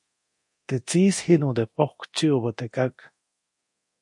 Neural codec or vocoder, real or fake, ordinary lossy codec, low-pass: codec, 24 kHz, 0.9 kbps, DualCodec; fake; MP3, 48 kbps; 10.8 kHz